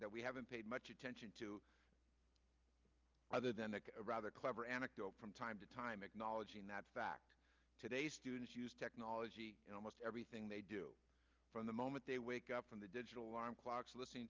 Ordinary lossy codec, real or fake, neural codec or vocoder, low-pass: Opus, 24 kbps; real; none; 7.2 kHz